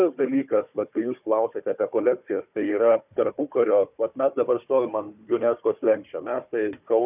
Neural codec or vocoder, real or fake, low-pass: codec, 16 kHz, 4 kbps, FunCodec, trained on Chinese and English, 50 frames a second; fake; 3.6 kHz